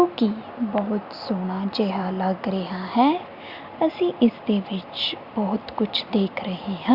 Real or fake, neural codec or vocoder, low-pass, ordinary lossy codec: real; none; 5.4 kHz; Opus, 64 kbps